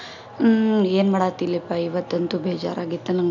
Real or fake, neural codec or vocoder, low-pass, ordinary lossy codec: real; none; 7.2 kHz; none